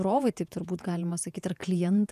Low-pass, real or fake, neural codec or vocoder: 14.4 kHz; fake; vocoder, 48 kHz, 128 mel bands, Vocos